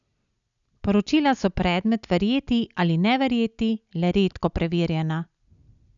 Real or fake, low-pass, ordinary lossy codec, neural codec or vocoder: real; 7.2 kHz; none; none